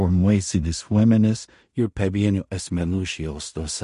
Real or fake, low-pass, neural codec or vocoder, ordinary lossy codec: fake; 10.8 kHz; codec, 16 kHz in and 24 kHz out, 0.4 kbps, LongCat-Audio-Codec, two codebook decoder; MP3, 48 kbps